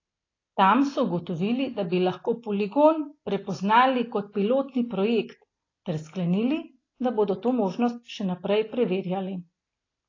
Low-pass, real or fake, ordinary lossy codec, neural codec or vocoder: 7.2 kHz; real; AAC, 32 kbps; none